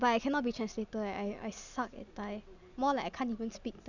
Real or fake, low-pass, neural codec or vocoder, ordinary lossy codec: real; 7.2 kHz; none; none